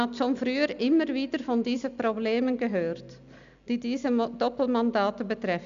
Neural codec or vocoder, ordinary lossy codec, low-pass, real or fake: none; MP3, 96 kbps; 7.2 kHz; real